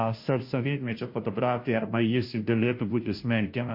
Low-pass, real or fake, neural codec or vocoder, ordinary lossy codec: 5.4 kHz; fake; codec, 16 kHz, 0.5 kbps, FunCodec, trained on Chinese and English, 25 frames a second; MP3, 32 kbps